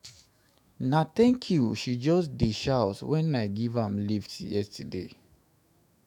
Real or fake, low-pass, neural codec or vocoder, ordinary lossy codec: fake; 19.8 kHz; autoencoder, 48 kHz, 128 numbers a frame, DAC-VAE, trained on Japanese speech; none